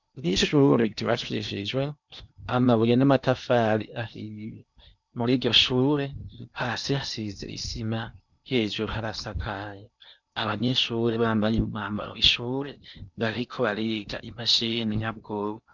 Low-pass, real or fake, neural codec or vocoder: 7.2 kHz; fake; codec, 16 kHz in and 24 kHz out, 0.8 kbps, FocalCodec, streaming, 65536 codes